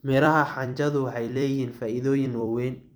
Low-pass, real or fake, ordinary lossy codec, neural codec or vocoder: none; fake; none; vocoder, 44.1 kHz, 128 mel bands every 256 samples, BigVGAN v2